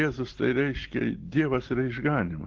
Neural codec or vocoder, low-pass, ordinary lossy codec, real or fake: none; 7.2 kHz; Opus, 16 kbps; real